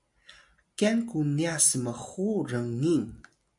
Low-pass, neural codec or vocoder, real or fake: 10.8 kHz; none; real